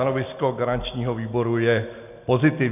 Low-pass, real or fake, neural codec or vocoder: 3.6 kHz; real; none